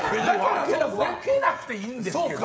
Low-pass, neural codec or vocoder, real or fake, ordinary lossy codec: none; codec, 16 kHz, 16 kbps, FreqCodec, smaller model; fake; none